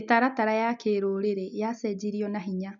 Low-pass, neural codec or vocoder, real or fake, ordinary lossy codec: 7.2 kHz; none; real; MP3, 64 kbps